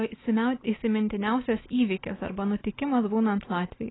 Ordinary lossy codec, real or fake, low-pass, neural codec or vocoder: AAC, 16 kbps; real; 7.2 kHz; none